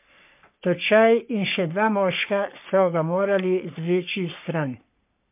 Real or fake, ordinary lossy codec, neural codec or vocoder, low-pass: fake; MP3, 32 kbps; codec, 44.1 kHz, 3.4 kbps, Pupu-Codec; 3.6 kHz